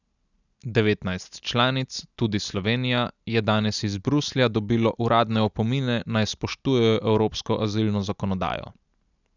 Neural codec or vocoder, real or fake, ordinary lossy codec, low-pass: none; real; none; 7.2 kHz